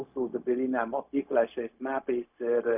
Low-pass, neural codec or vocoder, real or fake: 3.6 kHz; codec, 16 kHz, 0.4 kbps, LongCat-Audio-Codec; fake